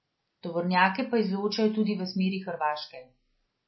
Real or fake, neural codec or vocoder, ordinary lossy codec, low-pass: real; none; MP3, 24 kbps; 7.2 kHz